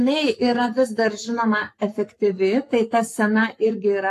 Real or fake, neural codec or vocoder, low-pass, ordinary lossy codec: fake; codec, 44.1 kHz, 7.8 kbps, Pupu-Codec; 14.4 kHz; AAC, 64 kbps